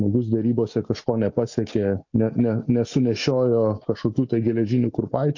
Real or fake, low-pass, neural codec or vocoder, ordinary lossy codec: real; 7.2 kHz; none; MP3, 48 kbps